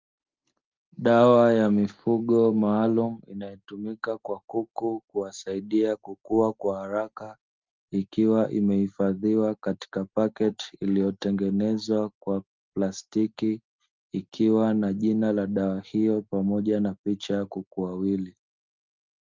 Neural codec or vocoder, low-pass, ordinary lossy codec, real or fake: none; 7.2 kHz; Opus, 32 kbps; real